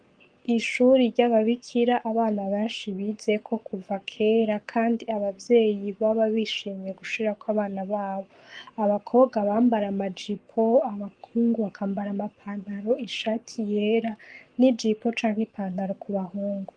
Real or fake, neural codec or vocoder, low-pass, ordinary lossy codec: fake; codec, 44.1 kHz, 7.8 kbps, Pupu-Codec; 9.9 kHz; Opus, 24 kbps